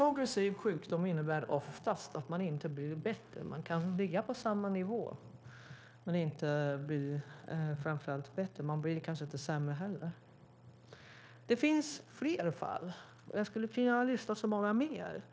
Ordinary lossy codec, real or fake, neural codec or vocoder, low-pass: none; fake; codec, 16 kHz, 0.9 kbps, LongCat-Audio-Codec; none